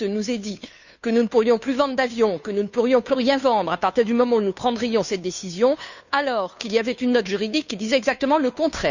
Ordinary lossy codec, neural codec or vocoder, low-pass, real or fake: none; codec, 16 kHz, 2 kbps, FunCodec, trained on Chinese and English, 25 frames a second; 7.2 kHz; fake